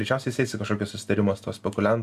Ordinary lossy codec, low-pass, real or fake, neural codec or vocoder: AAC, 96 kbps; 14.4 kHz; real; none